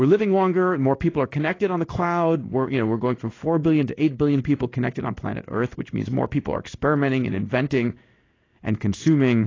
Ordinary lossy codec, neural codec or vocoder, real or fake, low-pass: AAC, 32 kbps; codec, 16 kHz in and 24 kHz out, 1 kbps, XY-Tokenizer; fake; 7.2 kHz